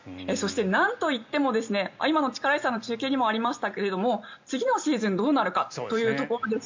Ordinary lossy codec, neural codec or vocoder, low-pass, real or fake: MP3, 64 kbps; none; 7.2 kHz; real